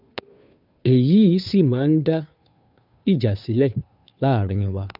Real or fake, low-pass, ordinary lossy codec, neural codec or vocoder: fake; 5.4 kHz; none; codec, 16 kHz, 2 kbps, FunCodec, trained on Chinese and English, 25 frames a second